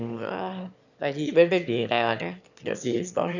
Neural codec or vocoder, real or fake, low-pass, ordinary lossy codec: autoencoder, 22.05 kHz, a latent of 192 numbers a frame, VITS, trained on one speaker; fake; 7.2 kHz; none